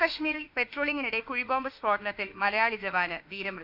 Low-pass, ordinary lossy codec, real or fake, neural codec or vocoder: 5.4 kHz; none; fake; autoencoder, 48 kHz, 32 numbers a frame, DAC-VAE, trained on Japanese speech